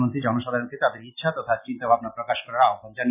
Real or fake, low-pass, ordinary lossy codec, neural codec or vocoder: real; 3.6 kHz; none; none